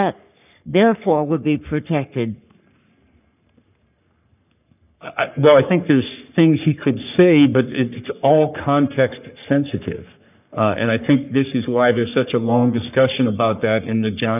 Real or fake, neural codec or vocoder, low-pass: fake; codec, 44.1 kHz, 3.4 kbps, Pupu-Codec; 3.6 kHz